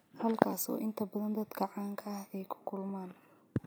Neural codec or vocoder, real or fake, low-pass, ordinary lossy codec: none; real; none; none